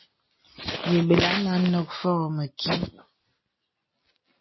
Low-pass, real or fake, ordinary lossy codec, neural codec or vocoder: 7.2 kHz; real; MP3, 24 kbps; none